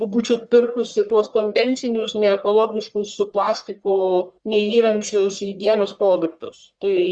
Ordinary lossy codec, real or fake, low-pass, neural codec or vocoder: Opus, 64 kbps; fake; 9.9 kHz; codec, 44.1 kHz, 1.7 kbps, Pupu-Codec